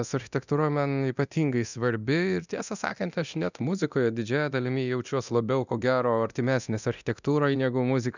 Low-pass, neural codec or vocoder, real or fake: 7.2 kHz; codec, 24 kHz, 0.9 kbps, DualCodec; fake